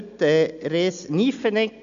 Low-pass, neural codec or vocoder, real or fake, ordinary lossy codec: 7.2 kHz; none; real; none